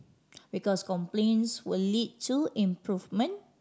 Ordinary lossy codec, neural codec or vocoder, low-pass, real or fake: none; none; none; real